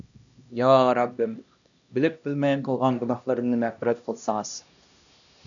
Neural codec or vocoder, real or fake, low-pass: codec, 16 kHz, 1 kbps, X-Codec, HuBERT features, trained on LibriSpeech; fake; 7.2 kHz